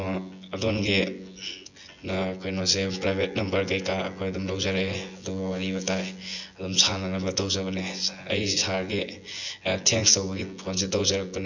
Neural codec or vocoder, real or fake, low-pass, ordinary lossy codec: vocoder, 24 kHz, 100 mel bands, Vocos; fake; 7.2 kHz; none